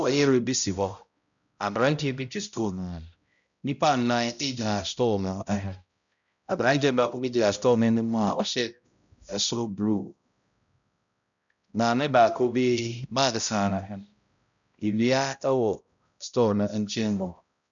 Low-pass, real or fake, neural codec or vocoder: 7.2 kHz; fake; codec, 16 kHz, 0.5 kbps, X-Codec, HuBERT features, trained on balanced general audio